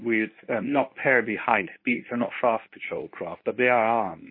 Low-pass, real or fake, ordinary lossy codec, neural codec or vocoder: 5.4 kHz; fake; MP3, 24 kbps; codec, 24 kHz, 0.9 kbps, WavTokenizer, medium speech release version 1